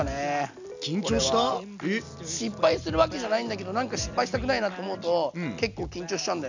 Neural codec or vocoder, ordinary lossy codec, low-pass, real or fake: none; none; 7.2 kHz; real